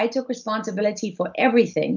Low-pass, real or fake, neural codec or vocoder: 7.2 kHz; real; none